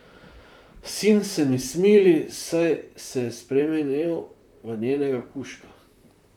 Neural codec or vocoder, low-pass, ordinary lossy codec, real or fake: vocoder, 44.1 kHz, 128 mel bands, Pupu-Vocoder; 19.8 kHz; none; fake